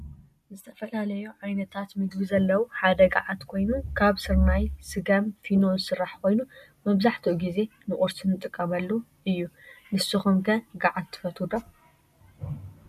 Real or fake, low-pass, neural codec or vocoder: real; 14.4 kHz; none